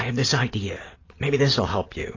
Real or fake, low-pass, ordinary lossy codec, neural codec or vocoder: real; 7.2 kHz; AAC, 32 kbps; none